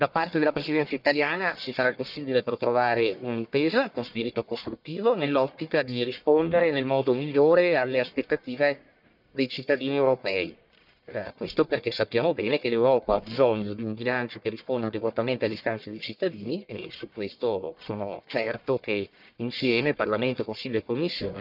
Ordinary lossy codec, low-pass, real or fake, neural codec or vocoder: none; 5.4 kHz; fake; codec, 44.1 kHz, 1.7 kbps, Pupu-Codec